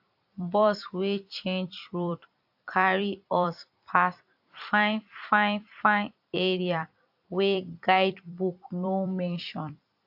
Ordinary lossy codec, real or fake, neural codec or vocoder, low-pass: AAC, 48 kbps; fake; vocoder, 44.1 kHz, 128 mel bands every 256 samples, BigVGAN v2; 5.4 kHz